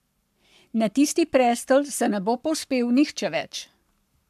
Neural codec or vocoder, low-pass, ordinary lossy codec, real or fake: codec, 44.1 kHz, 7.8 kbps, Pupu-Codec; 14.4 kHz; MP3, 96 kbps; fake